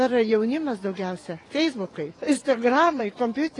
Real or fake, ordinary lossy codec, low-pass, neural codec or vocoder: fake; AAC, 32 kbps; 10.8 kHz; vocoder, 24 kHz, 100 mel bands, Vocos